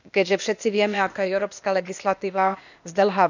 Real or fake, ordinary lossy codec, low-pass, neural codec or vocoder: fake; none; 7.2 kHz; codec, 16 kHz, 0.8 kbps, ZipCodec